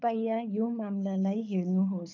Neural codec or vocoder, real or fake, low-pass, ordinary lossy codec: codec, 24 kHz, 6 kbps, HILCodec; fake; 7.2 kHz; none